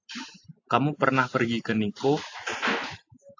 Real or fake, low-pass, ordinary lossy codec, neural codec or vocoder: real; 7.2 kHz; AAC, 48 kbps; none